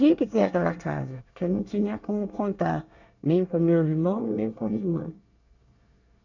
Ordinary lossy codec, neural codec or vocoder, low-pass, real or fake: none; codec, 24 kHz, 1 kbps, SNAC; 7.2 kHz; fake